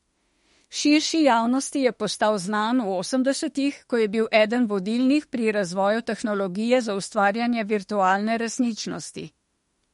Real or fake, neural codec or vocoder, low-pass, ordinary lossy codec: fake; autoencoder, 48 kHz, 32 numbers a frame, DAC-VAE, trained on Japanese speech; 19.8 kHz; MP3, 48 kbps